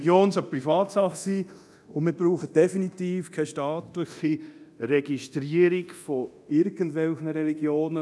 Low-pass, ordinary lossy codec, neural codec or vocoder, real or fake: none; none; codec, 24 kHz, 0.9 kbps, DualCodec; fake